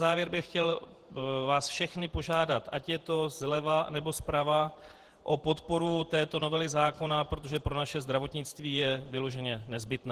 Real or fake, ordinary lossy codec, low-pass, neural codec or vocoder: fake; Opus, 16 kbps; 14.4 kHz; vocoder, 48 kHz, 128 mel bands, Vocos